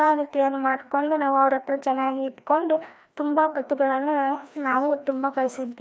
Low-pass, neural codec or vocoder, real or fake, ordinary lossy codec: none; codec, 16 kHz, 1 kbps, FreqCodec, larger model; fake; none